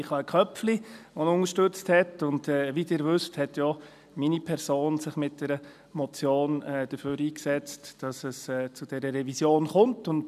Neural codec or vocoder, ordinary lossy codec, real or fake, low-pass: none; none; real; 14.4 kHz